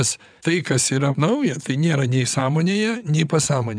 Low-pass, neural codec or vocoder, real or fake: 9.9 kHz; vocoder, 22.05 kHz, 80 mel bands, WaveNeXt; fake